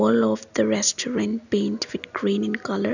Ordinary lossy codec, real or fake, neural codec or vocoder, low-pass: none; real; none; 7.2 kHz